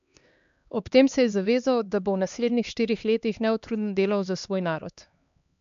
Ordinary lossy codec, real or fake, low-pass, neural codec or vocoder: MP3, 64 kbps; fake; 7.2 kHz; codec, 16 kHz, 2 kbps, X-Codec, HuBERT features, trained on LibriSpeech